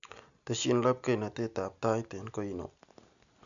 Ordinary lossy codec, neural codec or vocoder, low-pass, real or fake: none; none; 7.2 kHz; real